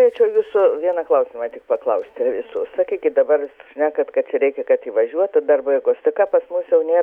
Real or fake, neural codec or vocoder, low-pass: fake; autoencoder, 48 kHz, 128 numbers a frame, DAC-VAE, trained on Japanese speech; 19.8 kHz